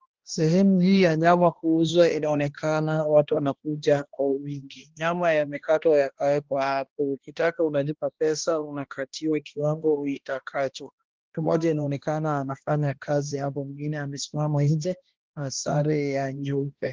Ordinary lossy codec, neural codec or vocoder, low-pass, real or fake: Opus, 16 kbps; codec, 16 kHz, 1 kbps, X-Codec, HuBERT features, trained on balanced general audio; 7.2 kHz; fake